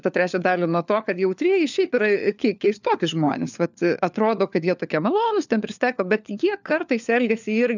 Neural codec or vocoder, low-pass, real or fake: codec, 16 kHz, 4 kbps, FunCodec, trained on LibriTTS, 50 frames a second; 7.2 kHz; fake